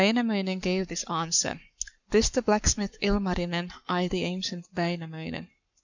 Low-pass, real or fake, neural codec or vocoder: 7.2 kHz; fake; codec, 44.1 kHz, 7.8 kbps, Pupu-Codec